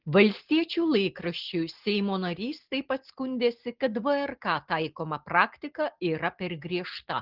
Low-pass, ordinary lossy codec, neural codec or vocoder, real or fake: 5.4 kHz; Opus, 16 kbps; none; real